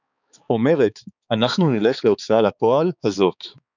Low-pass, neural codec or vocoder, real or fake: 7.2 kHz; codec, 16 kHz, 4 kbps, X-Codec, HuBERT features, trained on balanced general audio; fake